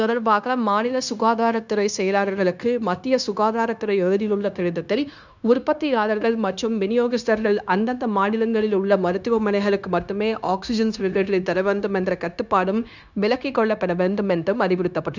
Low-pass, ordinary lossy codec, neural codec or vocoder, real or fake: 7.2 kHz; none; codec, 16 kHz, 0.9 kbps, LongCat-Audio-Codec; fake